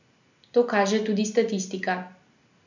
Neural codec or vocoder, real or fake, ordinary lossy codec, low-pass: none; real; none; 7.2 kHz